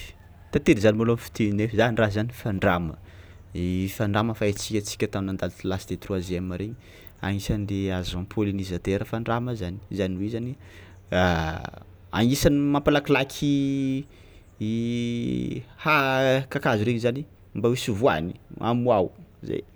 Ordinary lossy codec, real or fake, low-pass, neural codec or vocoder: none; real; none; none